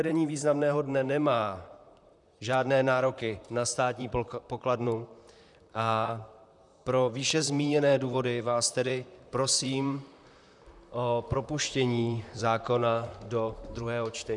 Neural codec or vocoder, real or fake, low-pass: vocoder, 44.1 kHz, 128 mel bands, Pupu-Vocoder; fake; 10.8 kHz